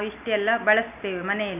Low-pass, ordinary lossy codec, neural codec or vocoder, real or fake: 3.6 kHz; none; none; real